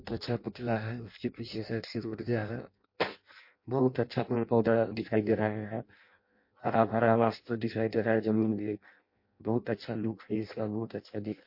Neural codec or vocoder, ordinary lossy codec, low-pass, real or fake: codec, 16 kHz in and 24 kHz out, 0.6 kbps, FireRedTTS-2 codec; MP3, 32 kbps; 5.4 kHz; fake